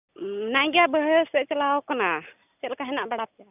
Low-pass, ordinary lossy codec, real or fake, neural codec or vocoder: 3.6 kHz; none; real; none